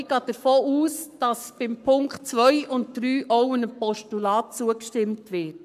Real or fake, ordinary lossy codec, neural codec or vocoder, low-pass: fake; none; codec, 44.1 kHz, 7.8 kbps, Pupu-Codec; 14.4 kHz